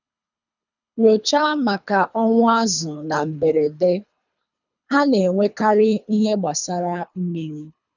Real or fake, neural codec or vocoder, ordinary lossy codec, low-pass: fake; codec, 24 kHz, 3 kbps, HILCodec; none; 7.2 kHz